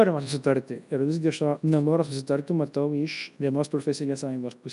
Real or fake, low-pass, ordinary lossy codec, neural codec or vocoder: fake; 10.8 kHz; AAC, 96 kbps; codec, 24 kHz, 0.9 kbps, WavTokenizer, large speech release